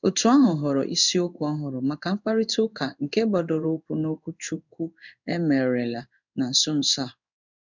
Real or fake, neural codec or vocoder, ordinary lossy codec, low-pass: fake; codec, 16 kHz in and 24 kHz out, 1 kbps, XY-Tokenizer; none; 7.2 kHz